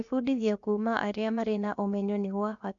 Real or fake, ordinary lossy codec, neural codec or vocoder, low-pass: fake; none; codec, 16 kHz, about 1 kbps, DyCAST, with the encoder's durations; 7.2 kHz